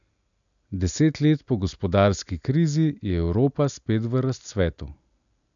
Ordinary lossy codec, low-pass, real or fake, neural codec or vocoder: none; 7.2 kHz; real; none